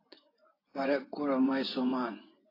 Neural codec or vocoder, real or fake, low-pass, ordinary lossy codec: vocoder, 24 kHz, 100 mel bands, Vocos; fake; 5.4 kHz; AAC, 24 kbps